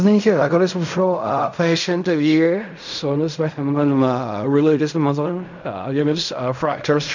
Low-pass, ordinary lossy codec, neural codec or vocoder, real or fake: 7.2 kHz; none; codec, 16 kHz in and 24 kHz out, 0.4 kbps, LongCat-Audio-Codec, fine tuned four codebook decoder; fake